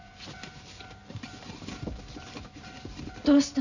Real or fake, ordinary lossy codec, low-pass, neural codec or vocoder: fake; none; 7.2 kHz; vocoder, 44.1 kHz, 128 mel bands every 512 samples, BigVGAN v2